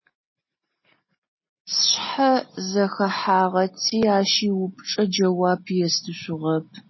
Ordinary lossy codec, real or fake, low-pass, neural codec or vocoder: MP3, 24 kbps; real; 7.2 kHz; none